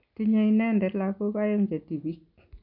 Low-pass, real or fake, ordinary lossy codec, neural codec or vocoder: 5.4 kHz; real; none; none